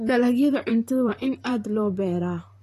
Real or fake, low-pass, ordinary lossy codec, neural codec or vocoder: fake; 14.4 kHz; AAC, 48 kbps; vocoder, 44.1 kHz, 128 mel bands, Pupu-Vocoder